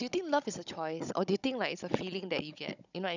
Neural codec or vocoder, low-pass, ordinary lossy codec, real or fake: codec, 16 kHz, 16 kbps, FunCodec, trained on Chinese and English, 50 frames a second; 7.2 kHz; none; fake